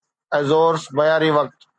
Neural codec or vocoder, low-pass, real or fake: none; 9.9 kHz; real